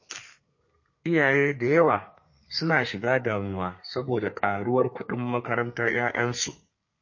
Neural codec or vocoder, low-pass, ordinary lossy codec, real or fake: codec, 32 kHz, 1.9 kbps, SNAC; 7.2 kHz; MP3, 32 kbps; fake